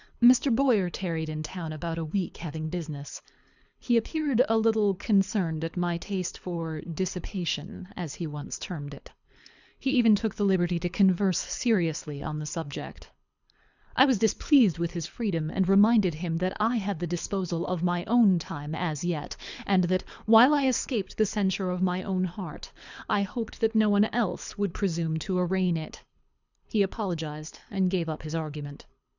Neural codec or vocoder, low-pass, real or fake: codec, 24 kHz, 6 kbps, HILCodec; 7.2 kHz; fake